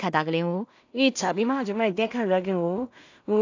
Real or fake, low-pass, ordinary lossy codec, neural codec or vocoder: fake; 7.2 kHz; AAC, 48 kbps; codec, 16 kHz in and 24 kHz out, 0.4 kbps, LongCat-Audio-Codec, two codebook decoder